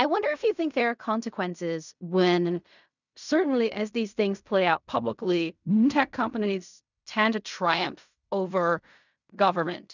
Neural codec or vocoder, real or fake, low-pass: codec, 16 kHz in and 24 kHz out, 0.4 kbps, LongCat-Audio-Codec, fine tuned four codebook decoder; fake; 7.2 kHz